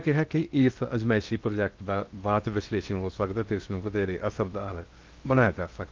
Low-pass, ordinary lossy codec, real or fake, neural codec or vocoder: 7.2 kHz; Opus, 24 kbps; fake; codec, 16 kHz in and 24 kHz out, 0.8 kbps, FocalCodec, streaming, 65536 codes